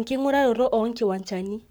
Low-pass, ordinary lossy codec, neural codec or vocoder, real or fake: none; none; codec, 44.1 kHz, 7.8 kbps, Pupu-Codec; fake